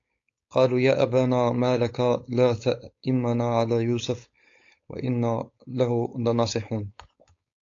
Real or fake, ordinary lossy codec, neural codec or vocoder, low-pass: fake; AAC, 48 kbps; codec, 16 kHz, 4.8 kbps, FACodec; 7.2 kHz